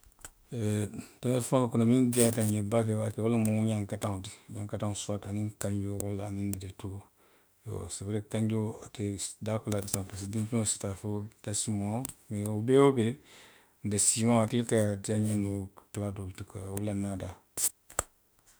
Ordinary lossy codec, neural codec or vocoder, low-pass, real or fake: none; autoencoder, 48 kHz, 32 numbers a frame, DAC-VAE, trained on Japanese speech; none; fake